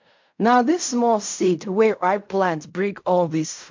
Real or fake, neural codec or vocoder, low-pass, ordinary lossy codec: fake; codec, 16 kHz in and 24 kHz out, 0.4 kbps, LongCat-Audio-Codec, fine tuned four codebook decoder; 7.2 kHz; MP3, 48 kbps